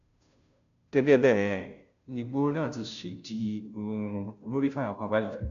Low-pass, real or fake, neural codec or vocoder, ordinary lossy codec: 7.2 kHz; fake; codec, 16 kHz, 0.5 kbps, FunCodec, trained on Chinese and English, 25 frames a second; none